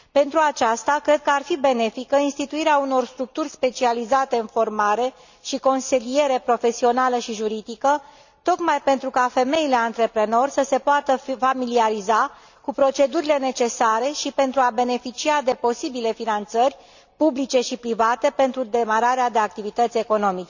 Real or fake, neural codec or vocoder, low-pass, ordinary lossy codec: real; none; 7.2 kHz; none